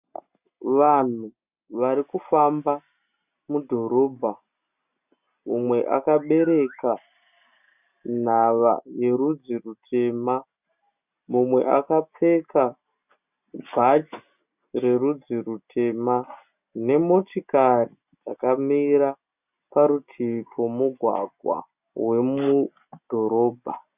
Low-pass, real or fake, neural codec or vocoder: 3.6 kHz; real; none